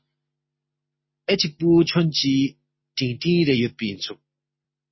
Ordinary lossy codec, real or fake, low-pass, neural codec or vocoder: MP3, 24 kbps; real; 7.2 kHz; none